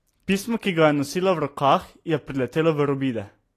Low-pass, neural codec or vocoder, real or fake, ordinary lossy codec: 14.4 kHz; none; real; AAC, 48 kbps